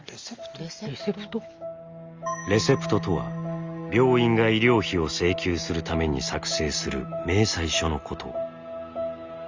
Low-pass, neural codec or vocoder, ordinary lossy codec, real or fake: 7.2 kHz; none; Opus, 32 kbps; real